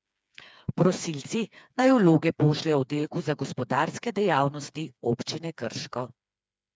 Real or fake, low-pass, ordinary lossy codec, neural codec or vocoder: fake; none; none; codec, 16 kHz, 4 kbps, FreqCodec, smaller model